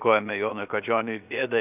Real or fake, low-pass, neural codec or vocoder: fake; 3.6 kHz; codec, 16 kHz, 0.7 kbps, FocalCodec